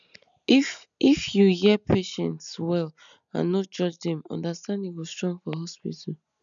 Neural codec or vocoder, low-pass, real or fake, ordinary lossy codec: none; 7.2 kHz; real; none